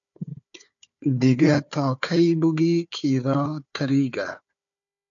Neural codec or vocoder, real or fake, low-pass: codec, 16 kHz, 4 kbps, FunCodec, trained on Chinese and English, 50 frames a second; fake; 7.2 kHz